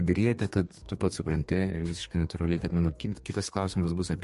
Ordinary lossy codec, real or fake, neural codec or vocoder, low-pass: MP3, 48 kbps; fake; codec, 32 kHz, 1.9 kbps, SNAC; 14.4 kHz